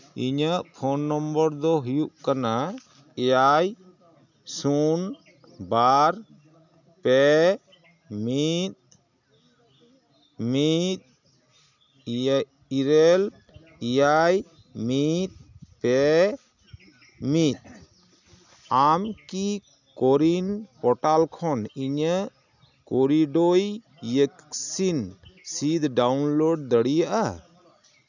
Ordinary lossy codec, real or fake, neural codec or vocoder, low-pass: none; real; none; 7.2 kHz